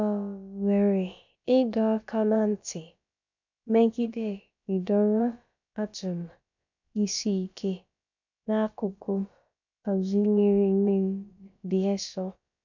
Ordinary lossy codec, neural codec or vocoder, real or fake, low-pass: none; codec, 16 kHz, about 1 kbps, DyCAST, with the encoder's durations; fake; 7.2 kHz